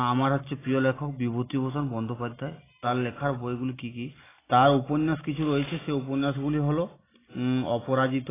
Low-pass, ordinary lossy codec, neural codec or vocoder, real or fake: 3.6 kHz; AAC, 16 kbps; none; real